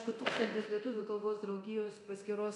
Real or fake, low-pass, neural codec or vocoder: fake; 10.8 kHz; codec, 24 kHz, 0.9 kbps, DualCodec